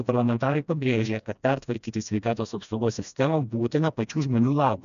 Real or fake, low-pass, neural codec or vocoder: fake; 7.2 kHz; codec, 16 kHz, 1 kbps, FreqCodec, smaller model